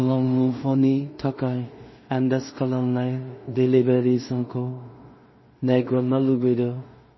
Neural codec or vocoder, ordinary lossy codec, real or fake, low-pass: codec, 16 kHz in and 24 kHz out, 0.4 kbps, LongCat-Audio-Codec, two codebook decoder; MP3, 24 kbps; fake; 7.2 kHz